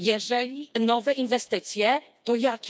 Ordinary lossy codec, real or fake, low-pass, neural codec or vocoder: none; fake; none; codec, 16 kHz, 2 kbps, FreqCodec, smaller model